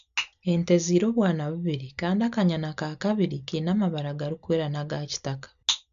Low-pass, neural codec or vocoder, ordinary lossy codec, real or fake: 7.2 kHz; none; none; real